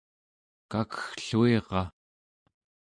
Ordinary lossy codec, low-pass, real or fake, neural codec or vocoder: MP3, 64 kbps; 9.9 kHz; real; none